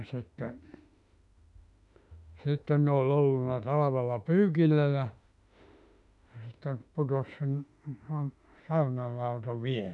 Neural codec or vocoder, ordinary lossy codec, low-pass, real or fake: autoencoder, 48 kHz, 32 numbers a frame, DAC-VAE, trained on Japanese speech; none; 10.8 kHz; fake